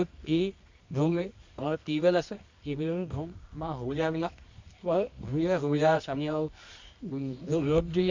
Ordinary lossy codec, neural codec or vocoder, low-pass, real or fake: MP3, 64 kbps; codec, 24 kHz, 0.9 kbps, WavTokenizer, medium music audio release; 7.2 kHz; fake